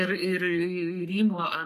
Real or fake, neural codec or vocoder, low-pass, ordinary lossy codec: fake; codec, 44.1 kHz, 3.4 kbps, Pupu-Codec; 14.4 kHz; MP3, 64 kbps